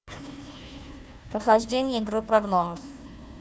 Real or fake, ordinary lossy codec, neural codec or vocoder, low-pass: fake; none; codec, 16 kHz, 1 kbps, FunCodec, trained on Chinese and English, 50 frames a second; none